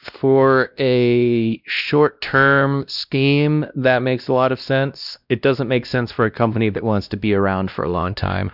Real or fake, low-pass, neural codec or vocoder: fake; 5.4 kHz; codec, 16 kHz, 1 kbps, X-Codec, WavLM features, trained on Multilingual LibriSpeech